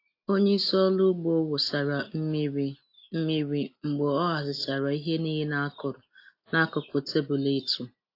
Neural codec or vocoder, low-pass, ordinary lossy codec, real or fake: none; 5.4 kHz; AAC, 32 kbps; real